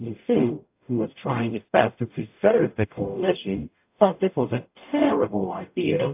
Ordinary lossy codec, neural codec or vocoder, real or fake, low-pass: AAC, 32 kbps; codec, 44.1 kHz, 0.9 kbps, DAC; fake; 3.6 kHz